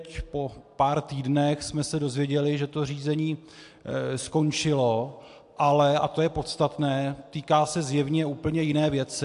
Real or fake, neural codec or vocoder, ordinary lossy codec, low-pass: real; none; AAC, 64 kbps; 10.8 kHz